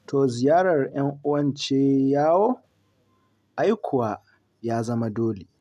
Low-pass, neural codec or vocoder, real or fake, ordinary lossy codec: 14.4 kHz; none; real; none